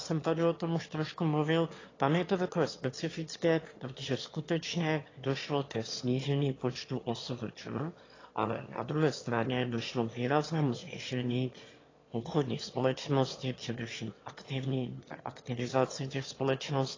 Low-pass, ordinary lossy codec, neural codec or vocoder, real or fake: 7.2 kHz; AAC, 32 kbps; autoencoder, 22.05 kHz, a latent of 192 numbers a frame, VITS, trained on one speaker; fake